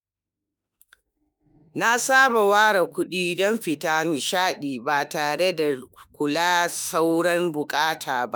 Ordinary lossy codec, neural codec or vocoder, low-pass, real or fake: none; autoencoder, 48 kHz, 32 numbers a frame, DAC-VAE, trained on Japanese speech; none; fake